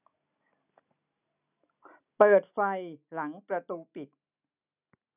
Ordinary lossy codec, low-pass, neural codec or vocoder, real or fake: none; 3.6 kHz; none; real